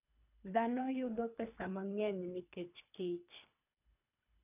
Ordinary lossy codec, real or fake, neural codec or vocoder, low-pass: none; fake; codec, 24 kHz, 3 kbps, HILCodec; 3.6 kHz